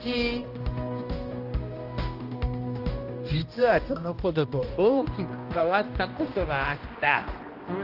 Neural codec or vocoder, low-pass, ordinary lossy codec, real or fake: codec, 16 kHz, 1 kbps, X-Codec, HuBERT features, trained on balanced general audio; 5.4 kHz; Opus, 24 kbps; fake